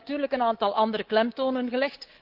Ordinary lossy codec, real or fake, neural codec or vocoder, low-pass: Opus, 16 kbps; fake; codec, 24 kHz, 3.1 kbps, DualCodec; 5.4 kHz